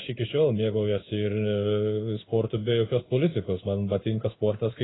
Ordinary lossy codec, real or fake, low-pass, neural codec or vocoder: AAC, 16 kbps; fake; 7.2 kHz; codec, 16 kHz in and 24 kHz out, 1 kbps, XY-Tokenizer